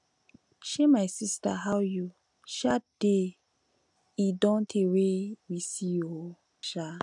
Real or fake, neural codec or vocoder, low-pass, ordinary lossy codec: real; none; 10.8 kHz; none